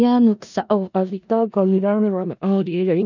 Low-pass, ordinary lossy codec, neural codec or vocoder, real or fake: 7.2 kHz; none; codec, 16 kHz in and 24 kHz out, 0.4 kbps, LongCat-Audio-Codec, four codebook decoder; fake